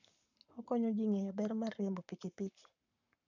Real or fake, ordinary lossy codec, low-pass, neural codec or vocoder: fake; none; 7.2 kHz; codec, 44.1 kHz, 7.8 kbps, Pupu-Codec